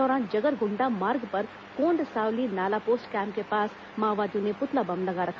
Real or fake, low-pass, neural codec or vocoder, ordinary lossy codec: real; none; none; none